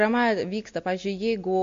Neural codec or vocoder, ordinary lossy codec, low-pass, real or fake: none; MP3, 48 kbps; 7.2 kHz; real